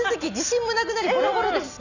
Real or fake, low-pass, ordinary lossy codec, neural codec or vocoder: real; 7.2 kHz; none; none